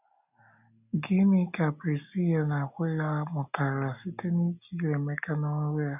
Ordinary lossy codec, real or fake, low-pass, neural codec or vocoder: none; real; 3.6 kHz; none